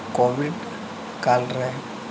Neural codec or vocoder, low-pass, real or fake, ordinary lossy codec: none; none; real; none